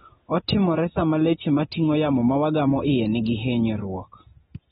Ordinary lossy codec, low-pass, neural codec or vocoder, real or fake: AAC, 16 kbps; 7.2 kHz; none; real